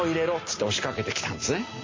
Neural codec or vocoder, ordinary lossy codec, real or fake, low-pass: none; MP3, 32 kbps; real; 7.2 kHz